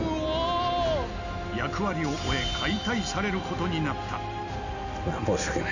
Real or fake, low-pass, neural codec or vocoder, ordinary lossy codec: real; 7.2 kHz; none; none